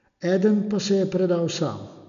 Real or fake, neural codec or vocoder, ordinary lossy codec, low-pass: real; none; none; 7.2 kHz